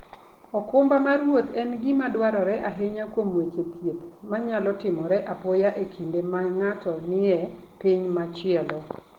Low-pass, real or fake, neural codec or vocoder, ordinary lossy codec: 19.8 kHz; real; none; Opus, 16 kbps